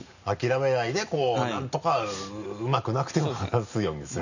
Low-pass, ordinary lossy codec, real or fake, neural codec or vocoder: 7.2 kHz; none; real; none